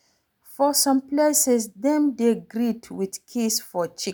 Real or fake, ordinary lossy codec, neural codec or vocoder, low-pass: real; none; none; none